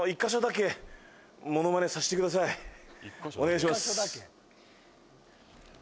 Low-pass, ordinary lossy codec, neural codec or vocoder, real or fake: none; none; none; real